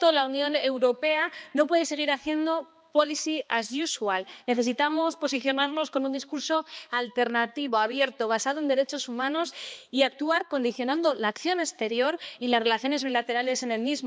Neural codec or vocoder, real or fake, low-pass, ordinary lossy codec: codec, 16 kHz, 2 kbps, X-Codec, HuBERT features, trained on balanced general audio; fake; none; none